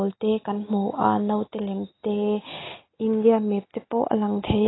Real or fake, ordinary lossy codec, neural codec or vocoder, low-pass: real; AAC, 16 kbps; none; 7.2 kHz